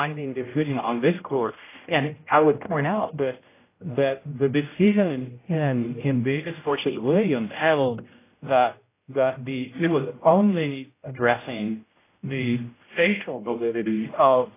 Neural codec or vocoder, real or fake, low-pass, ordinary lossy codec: codec, 16 kHz, 0.5 kbps, X-Codec, HuBERT features, trained on general audio; fake; 3.6 kHz; AAC, 24 kbps